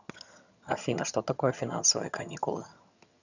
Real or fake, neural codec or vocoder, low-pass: fake; vocoder, 22.05 kHz, 80 mel bands, HiFi-GAN; 7.2 kHz